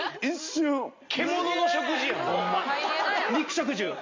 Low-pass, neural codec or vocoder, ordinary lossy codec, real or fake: 7.2 kHz; none; MP3, 48 kbps; real